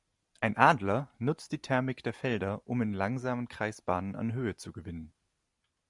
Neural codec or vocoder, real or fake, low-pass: none; real; 10.8 kHz